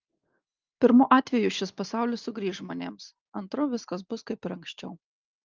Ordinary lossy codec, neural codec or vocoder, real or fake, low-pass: Opus, 24 kbps; none; real; 7.2 kHz